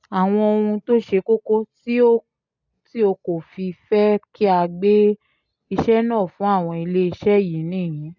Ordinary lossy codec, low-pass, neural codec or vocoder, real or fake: none; 7.2 kHz; none; real